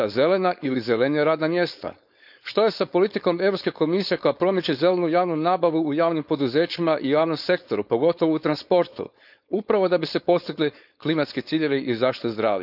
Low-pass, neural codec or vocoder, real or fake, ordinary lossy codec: 5.4 kHz; codec, 16 kHz, 4.8 kbps, FACodec; fake; none